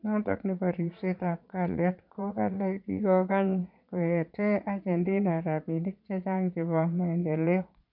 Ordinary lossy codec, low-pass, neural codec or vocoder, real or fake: none; 5.4 kHz; vocoder, 22.05 kHz, 80 mel bands, Vocos; fake